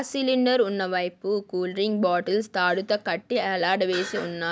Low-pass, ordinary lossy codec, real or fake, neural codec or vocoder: none; none; real; none